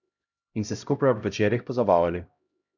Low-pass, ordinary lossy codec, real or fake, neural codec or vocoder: 7.2 kHz; none; fake; codec, 16 kHz, 0.5 kbps, X-Codec, HuBERT features, trained on LibriSpeech